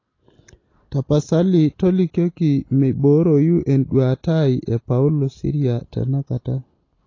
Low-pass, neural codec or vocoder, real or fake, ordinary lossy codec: 7.2 kHz; none; real; AAC, 32 kbps